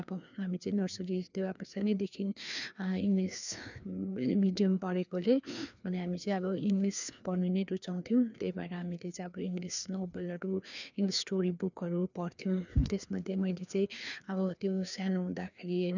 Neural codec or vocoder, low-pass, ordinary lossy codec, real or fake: codec, 16 kHz, 2 kbps, FreqCodec, larger model; 7.2 kHz; none; fake